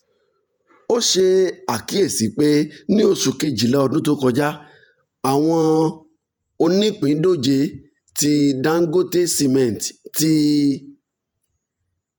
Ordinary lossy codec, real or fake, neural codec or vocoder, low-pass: none; fake; vocoder, 48 kHz, 128 mel bands, Vocos; none